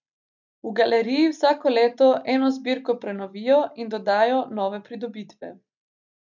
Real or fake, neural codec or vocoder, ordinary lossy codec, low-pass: real; none; none; 7.2 kHz